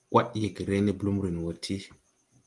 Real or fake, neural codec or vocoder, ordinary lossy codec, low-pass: real; none; Opus, 24 kbps; 10.8 kHz